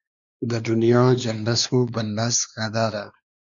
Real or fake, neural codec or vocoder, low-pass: fake; codec, 16 kHz, 2 kbps, X-Codec, WavLM features, trained on Multilingual LibriSpeech; 7.2 kHz